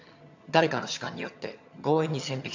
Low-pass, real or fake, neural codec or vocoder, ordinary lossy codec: 7.2 kHz; fake; vocoder, 22.05 kHz, 80 mel bands, HiFi-GAN; AAC, 48 kbps